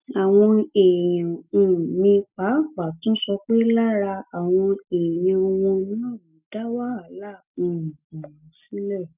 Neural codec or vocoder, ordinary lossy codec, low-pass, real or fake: none; none; 3.6 kHz; real